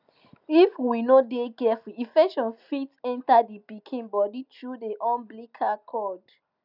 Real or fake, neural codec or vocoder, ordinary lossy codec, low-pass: real; none; none; 5.4 kHz